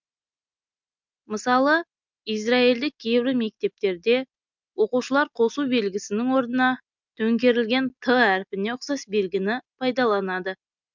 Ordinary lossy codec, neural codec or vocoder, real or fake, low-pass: MP3, 64 kbps; none; real; 7.2 kHz